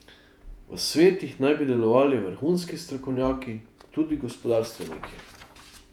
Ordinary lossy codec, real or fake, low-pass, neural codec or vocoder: none; fake; 19.8 kHz; vocoder, 48 kHz, 128 mel bands, Vocos